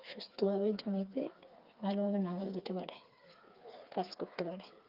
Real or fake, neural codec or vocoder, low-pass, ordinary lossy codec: fake; codec, 16 kHz, 4 kbps, FreqCodec, smaller model; 5.4 kHz; Opus, 24 kbps